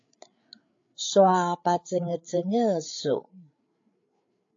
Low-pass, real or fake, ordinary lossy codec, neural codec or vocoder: 7.2 kHz; fake; AAC, 48 kbps; codec, 16 kHz, 8 kbps, FreqCodec, larger model